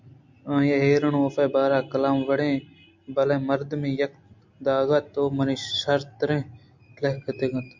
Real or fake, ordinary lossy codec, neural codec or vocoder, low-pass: real; MP3, 64 kbps; none; 7.2 kHz